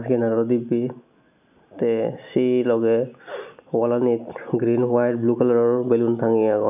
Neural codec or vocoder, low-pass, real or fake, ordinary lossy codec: none; 3.6 kHz; real; AAC, 32 kbps